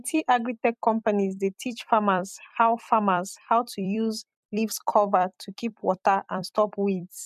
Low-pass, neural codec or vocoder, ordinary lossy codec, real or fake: 14.4 kHz; vocoder, 44.1 kHz, 128 mel bands every 256 samples, BigVGAN v2; MP3, 96 kbps; fake